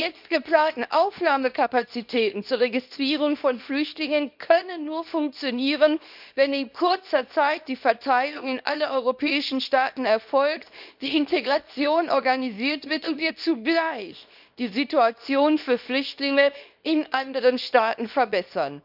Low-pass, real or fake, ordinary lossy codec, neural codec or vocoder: 5.4 kHz; fake; none; codec, 24 kHz, 0.9 kbps, WavTokenizer, small release